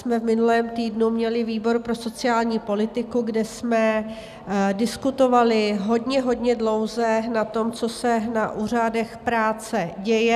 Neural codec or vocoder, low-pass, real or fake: none; 14.4 kHz; real